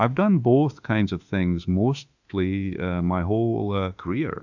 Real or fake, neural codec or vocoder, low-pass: fake; autoencoder, 48 kHz, 32 numbers a frame, DAC-VAE, trained on Japanese speech; 7.2 kHz